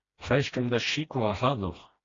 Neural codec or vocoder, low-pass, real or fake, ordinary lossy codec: codec, 16 kHz, 1 kbps, FreqCodec, smaller model; 7.2 kHz; fake; AAC, 32 kbps